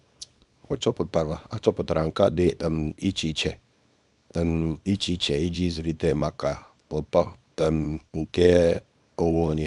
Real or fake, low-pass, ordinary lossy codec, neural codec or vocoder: fake; 10.8 kHz; none; codec, 24 kHz, 0.9 kbps, WavTokenizer, small release